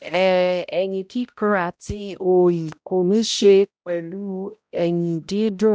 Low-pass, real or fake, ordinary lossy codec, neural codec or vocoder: none; fake; none; codec, 16 kHz, 0.5 kbps, X-Codec, HuBERT features, trained on balanced general audio